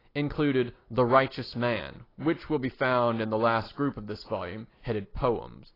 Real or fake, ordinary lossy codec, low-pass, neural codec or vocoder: real; AAC, 24 kbps; 5.4 kHz; none